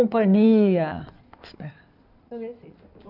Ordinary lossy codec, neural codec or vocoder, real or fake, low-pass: none; codec, 16 kHz in and 24 kHz out, 2.2 kbps, FireRedTTS-2 codec; fake; 5.4 kHz